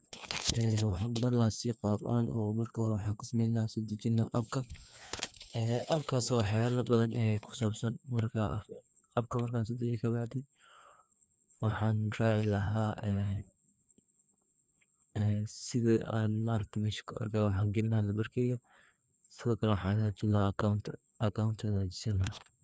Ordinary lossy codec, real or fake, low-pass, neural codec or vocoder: none; fake; none; codec, 16 kHz, 2 kbps, FreqCodec, larger model